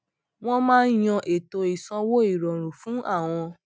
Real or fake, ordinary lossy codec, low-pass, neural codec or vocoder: real; none; none; none